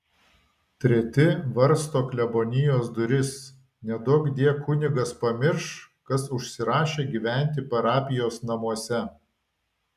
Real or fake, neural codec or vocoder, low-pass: real; none; 14.4 kHz